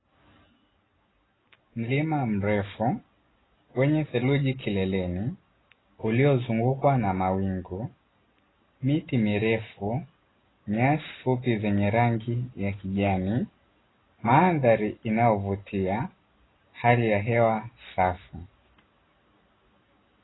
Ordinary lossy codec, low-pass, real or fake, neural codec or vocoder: AAC, 16 kbps; 7.2 kHz; real; none